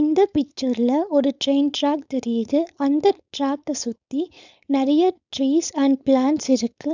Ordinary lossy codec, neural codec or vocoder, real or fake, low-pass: none; codec, 16 kHz, 4.8 kbps, FACodec; fake; 7.2 kHz